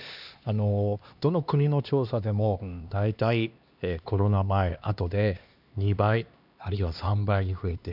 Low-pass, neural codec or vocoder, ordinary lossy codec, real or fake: 5.4 kHz; codec, 16 kHz, 2 kbps, X-Codec, HuBERT features, trained on LibriSpeech; none; fake